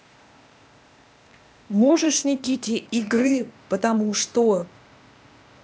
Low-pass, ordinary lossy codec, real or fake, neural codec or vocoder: none; none; fake; codec, 16 kHz, 0.8 kbps, ZipCodec